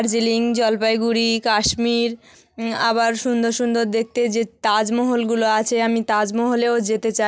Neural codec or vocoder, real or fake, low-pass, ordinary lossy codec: none; real; none; none